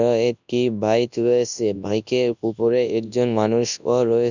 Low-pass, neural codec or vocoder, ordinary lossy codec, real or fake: 7.2 kHz; codec, 24 kHz, 0.9 kbps, WavTokenizer, large speech release; none; fake